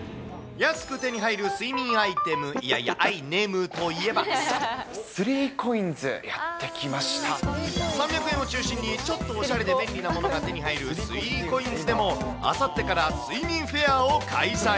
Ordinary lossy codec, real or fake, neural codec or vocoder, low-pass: none; real; none; none